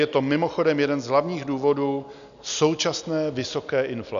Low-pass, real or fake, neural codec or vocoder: 7.2 kHz; real; none